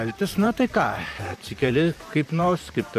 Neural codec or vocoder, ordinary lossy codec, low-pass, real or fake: vocoder, 44.1 kHz, 128 mel bands, Pupu-Vocoder; AAC, 96 kbps; 14.4 kHz; fake